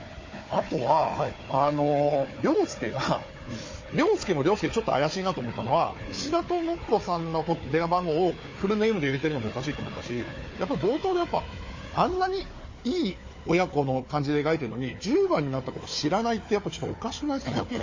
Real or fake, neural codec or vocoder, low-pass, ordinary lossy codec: fake; codec, 16 kHz, 4 kbps, FunCodec, trained on Chinese and English, 50 frames a second; 7.2 kHz; MP3, 32 kbps